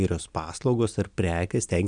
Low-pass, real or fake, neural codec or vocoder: 9.9 kHz; real; none